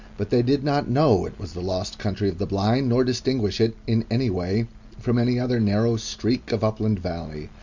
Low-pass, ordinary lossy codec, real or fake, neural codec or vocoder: 7.2 kHz; Opus, 64 kbps; real; none